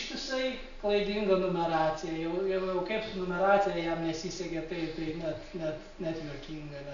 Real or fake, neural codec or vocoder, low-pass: real; none; 7.2 kHz